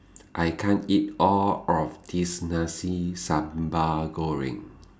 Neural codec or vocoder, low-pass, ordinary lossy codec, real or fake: none; none; none; real